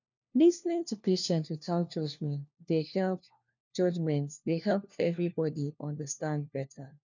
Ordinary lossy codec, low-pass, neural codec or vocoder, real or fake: none; 7.2 kHz; codec, 16 kHz, 1 kbps, FunCodec, trained on LibriTTS, 50 frames a second; fake